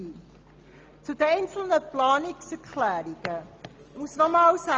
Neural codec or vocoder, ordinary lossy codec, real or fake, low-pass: none; Opus, 24 kbps; real; 7.2 kHz